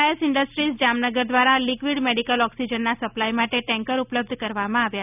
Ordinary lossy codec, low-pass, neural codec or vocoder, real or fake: none; 3.6 kHz; none; real